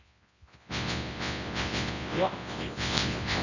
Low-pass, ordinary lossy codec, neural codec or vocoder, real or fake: 7.2 kHz; none; codec, 24 kHz, 0.9 kbps, WavTokenizer, large speech release; fake